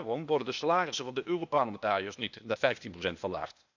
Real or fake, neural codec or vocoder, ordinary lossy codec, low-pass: fake; codec, 16 kHz, 0.8 kbps, ZipCodec; none; 7.2 kHz